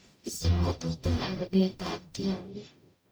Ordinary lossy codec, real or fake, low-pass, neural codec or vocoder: none; fake; none; codec, 44.1 kHz, 0.9 kbps, DAC